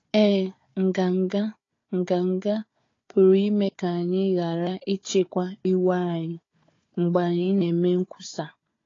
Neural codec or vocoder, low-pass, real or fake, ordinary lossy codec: codec, 16 kHz, 4 kbps, FunCodec, trained on Chinese and English, 50 frames a second; 7.2 kHz; fake; AAC, 32 kbps